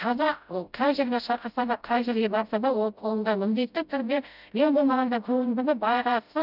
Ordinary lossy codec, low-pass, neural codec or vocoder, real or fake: none; 5.4 kHz; codec, 16 kHz, 0.5 kbps, FreqCodec, smaller model; fake